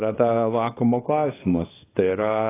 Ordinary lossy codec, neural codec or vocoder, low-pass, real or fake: AAC, 16 kbps; codec, 24 kHz, 0.9 kbps, WavTokenizer, small release; 3.6 kHz; fake